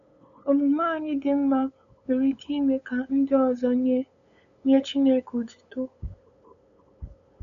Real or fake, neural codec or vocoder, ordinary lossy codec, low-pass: fake; codec, 16 kHz, 8 kbps, FunCodec, trained on LibriTTS, 25 frames a second; none; 7.2 kHz